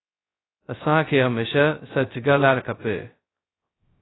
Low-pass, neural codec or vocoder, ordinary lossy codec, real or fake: 7.2 kHz; codec, 16 kHz, 0.2 kbps, FocalCodec; AAC, 16 kbps; fake